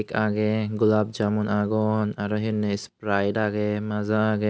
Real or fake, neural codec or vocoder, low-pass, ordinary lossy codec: real; none; none; none